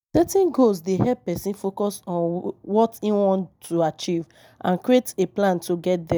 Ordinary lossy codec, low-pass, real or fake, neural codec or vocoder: none; none; real; none